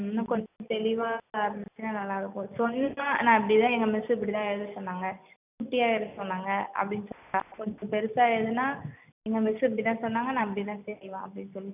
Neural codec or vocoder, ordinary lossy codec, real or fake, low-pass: none; none; real; 3.6 kHz